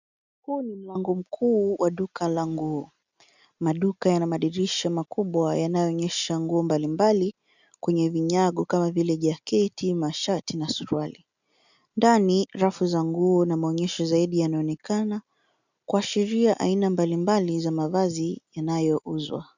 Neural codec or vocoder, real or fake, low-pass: none; real; 7.2 kHz